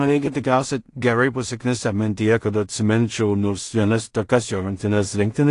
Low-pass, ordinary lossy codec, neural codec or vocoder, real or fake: 10.8 kHz; AAC, 48 kbps; codec, 16 kHz in and 24 kHz out, 0.4 kbps, LongCat-Audio-Codec, two codebook decoder; fake